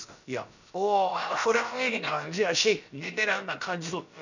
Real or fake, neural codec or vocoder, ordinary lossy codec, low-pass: fake; codec, 16 kHz, about 1 kbps, DyCAST, with the encoder's durations; none; 7.2 kHz